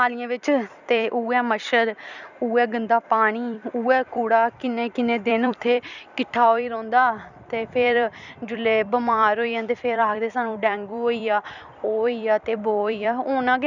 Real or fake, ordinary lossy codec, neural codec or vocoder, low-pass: fake; none; vocoder, 44.1 kHz, 128 mel bands every 256 samples, BigVGAN v2; 7.2 kHz